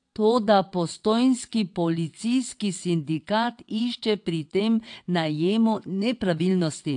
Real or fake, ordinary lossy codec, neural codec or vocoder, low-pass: fake; AAC, 64 kbps; vocoder, 22.05 kHz, 80 mel bands, WaveNeXt; 9.9 kHz